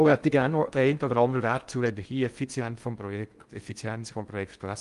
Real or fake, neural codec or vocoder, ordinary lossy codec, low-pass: fake; codec, 16 kHz in and 24 kHz out, 0.6 kbps, FocalCodec, streaming, 2048 codes; Opus, 32 kbps; 10.8 kHz